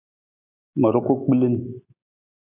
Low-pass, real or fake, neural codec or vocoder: 3.6 kHz; real; none